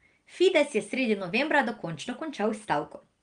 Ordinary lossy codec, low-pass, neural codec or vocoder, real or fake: Opus, 24 kbps; 9.9 kHz; none; real